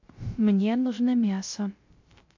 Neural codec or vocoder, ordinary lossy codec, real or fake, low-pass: codec, 16 kHz, 0.3 kbps, FocalCodec; MP3, 48 kbps; fake; 7.2 kHz